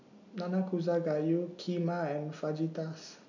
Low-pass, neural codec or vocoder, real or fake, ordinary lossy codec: 7.2 kHz; none; real; none